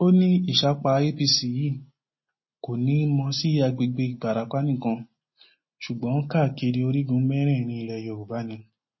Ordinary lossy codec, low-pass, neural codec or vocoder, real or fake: MP3, 24 kbps; 7.2 kHz; none; real